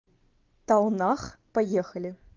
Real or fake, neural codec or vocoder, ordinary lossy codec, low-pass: real; none; Opus, 32 kbps; 7.2 kHz